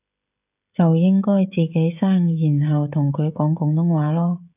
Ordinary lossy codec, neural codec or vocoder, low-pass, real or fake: AAC, 32 kbps; codec, 16 kHz, 16 kbps, FreqCodec, smaller model; 3.6 kHz; fake